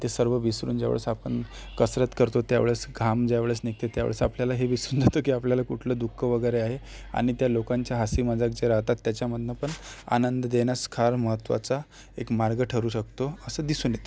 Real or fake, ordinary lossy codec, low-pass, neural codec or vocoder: real; none; none; none